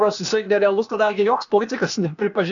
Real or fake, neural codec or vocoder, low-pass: fake; codec, 16 kHz, 0.8 kbps, ZipCodec; 7.2 kHz